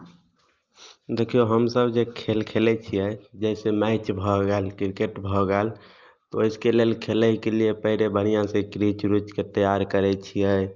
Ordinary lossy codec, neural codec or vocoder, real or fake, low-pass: Opus, 24 kbps; none; real; 7.2 kHz